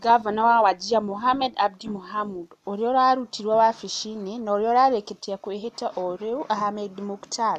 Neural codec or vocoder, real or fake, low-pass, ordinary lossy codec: none; real; 14.4 kHz; none